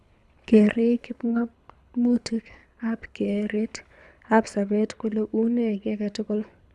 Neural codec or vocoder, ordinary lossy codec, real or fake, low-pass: codec, 24 kHz, 6 kbps, HILCodec; none; fake; none